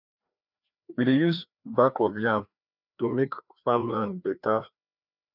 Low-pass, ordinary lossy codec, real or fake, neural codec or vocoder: 5.4 kHz; none; fake; codec, 16 kHz, 2 kbps, FreqCodec, larger model